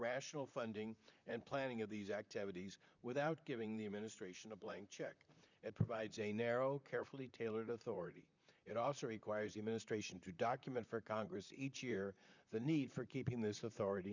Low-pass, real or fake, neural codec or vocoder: 7.2 kHz; fake; vocoder, 44.1 kHz, 128 mel bands, Pupu-Vocoder